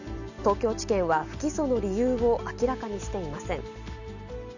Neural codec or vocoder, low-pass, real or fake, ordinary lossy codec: none; 7.2 kHz; real; none